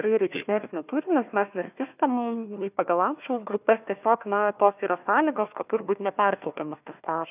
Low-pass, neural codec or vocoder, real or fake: 3.6 kHz; codec, 16 kHz, 1 kbps, FunCodec, trained on Chinese and English, 50 frames a second; fake